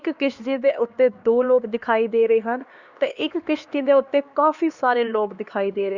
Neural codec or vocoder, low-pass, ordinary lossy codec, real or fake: codec, 16 kHz, 2 kbps, X-Codec, HuBERT features, trained on LibriSpeech; 7.2 kHz; Opus, 64 kbps; fake